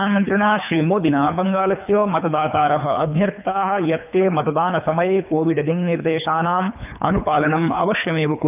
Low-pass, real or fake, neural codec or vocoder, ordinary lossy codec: 3.6 kHz; fake; codec, 24 kHz, 3 kbps, HILCodec; none